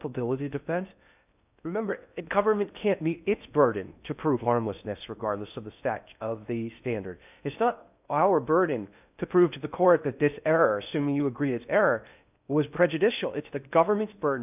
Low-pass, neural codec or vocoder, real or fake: 3.6 kHz; codec, 16 kHz in and 24 kHz out, 0.6 kbps, FocalCodec, streaming, 2048 codes; fake